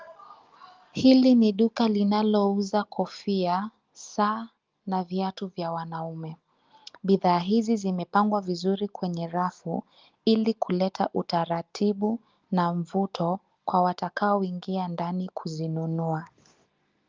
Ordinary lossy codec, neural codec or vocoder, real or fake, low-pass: Opus, 32 kbps; none; real; 7.2 kHz